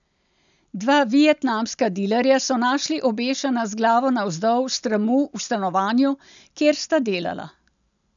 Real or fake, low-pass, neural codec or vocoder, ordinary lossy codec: real; 7.2 kHz; none; none